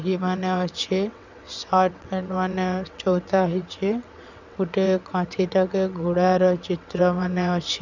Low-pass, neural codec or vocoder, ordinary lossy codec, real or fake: 7.2 kHz; vocoder, 22.05 kHz, 80 mel bands, WaveNeXt; none; fake